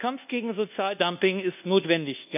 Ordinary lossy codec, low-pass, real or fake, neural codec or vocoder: none; 3.6 kHz; fake; codec, 24 kHz, 1.2 kbps, DualCodec